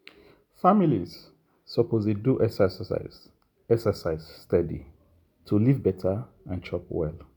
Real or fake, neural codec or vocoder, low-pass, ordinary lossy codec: real; none; none; none